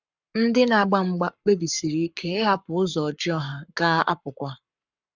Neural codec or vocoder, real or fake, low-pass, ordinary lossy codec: codec, 44.1 kHz, 7.8 kbps, Pupu-Codec; fake; 7.2 kHz; none